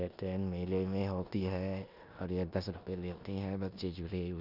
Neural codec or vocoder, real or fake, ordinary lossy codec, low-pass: codec, 16 kHz in and 24 kHz out, 0.9 kbps, LongCat-Audio-Codec, four codebook decoder; fake; none; 5.4 kHz